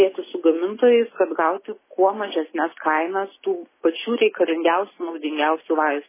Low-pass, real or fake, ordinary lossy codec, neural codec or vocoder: 3.6 kHz; real; MP3, 16 kbps; none